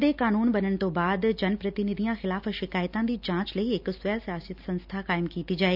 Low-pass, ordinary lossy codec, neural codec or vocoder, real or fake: 5.4 kHz; none; none; real